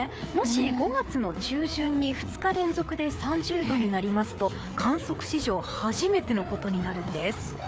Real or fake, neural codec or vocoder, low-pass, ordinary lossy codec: fake; codec, 16 kHz, 4 kbps, FreqCodec, larger model; none; none